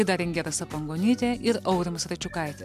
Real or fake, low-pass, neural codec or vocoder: real; 14.4 kHz; none